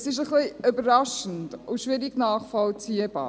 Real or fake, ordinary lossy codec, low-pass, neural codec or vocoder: real; none; none; none